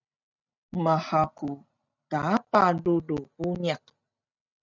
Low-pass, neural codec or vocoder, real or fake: 7.2 kHz; none; real